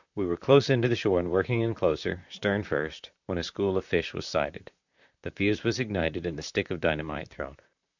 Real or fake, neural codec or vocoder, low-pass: fake; vocoder, 44.1 kHz, 128 mel bands, Pupu-Vocoder; 7.2 kHz